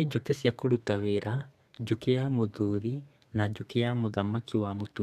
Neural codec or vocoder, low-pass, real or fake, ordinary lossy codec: codec, 32 kHz, 1.9 kbps, SNAC; 14.4 kHz; fake; none